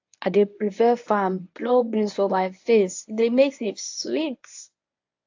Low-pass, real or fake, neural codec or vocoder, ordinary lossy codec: 7.2 kHz; fake; codec, 24 kHz, 0.9 kbps, WavTokenizer, medium speech release version 1; AAC, 48 kbps